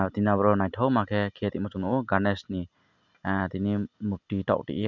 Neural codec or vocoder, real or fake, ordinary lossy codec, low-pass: none; real; none; 7.2 kHz